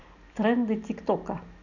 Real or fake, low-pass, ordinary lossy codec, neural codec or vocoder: real; 7.2 kHz; none; none